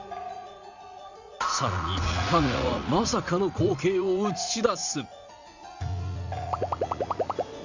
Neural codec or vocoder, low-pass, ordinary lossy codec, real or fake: vocoder, 44.1 kHz, 80 mel bands, Vocos; 7.2 kHz; Opus, 64 kbps; fake